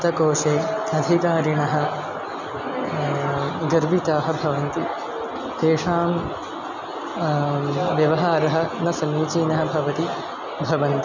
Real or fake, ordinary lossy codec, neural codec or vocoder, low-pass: real; none; none; 7.2 kHz